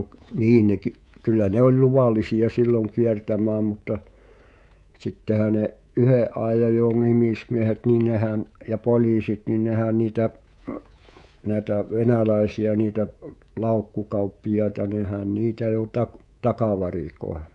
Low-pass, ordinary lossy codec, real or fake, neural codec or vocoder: 10.8 kHz; none; fake; codec, 24 kHz, 3.1 kbps, DualCodec